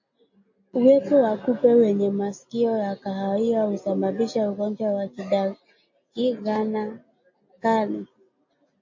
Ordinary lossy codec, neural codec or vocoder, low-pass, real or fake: MP3, 32 kbps; none; 7.2 kHz; real